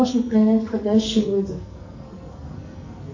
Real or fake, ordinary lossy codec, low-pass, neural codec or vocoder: fake; AAC, 48 kbps; 7.2 kHz; codec, 44.1 kHz, 2.6 kbps, SNAC